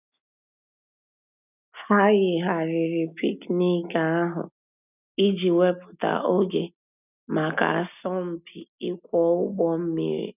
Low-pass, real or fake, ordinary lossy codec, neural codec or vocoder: 3.6 kHz; real; none; none